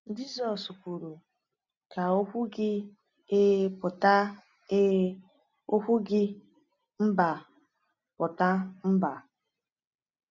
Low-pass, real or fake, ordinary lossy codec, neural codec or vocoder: 7.2 kHz; real; none; none